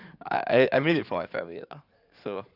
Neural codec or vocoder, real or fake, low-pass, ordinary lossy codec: codec, 16 kHz in and 24 kHz out, 2.2 kbps, FireRedTTS-2 codec; fake; 5.4 kHz; none